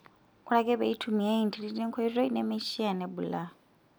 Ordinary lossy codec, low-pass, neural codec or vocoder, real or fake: none; none; none; real